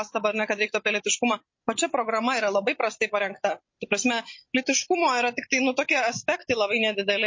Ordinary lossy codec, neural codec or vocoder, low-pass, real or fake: MP3, 32 kbps; none; 7.2 kHz; real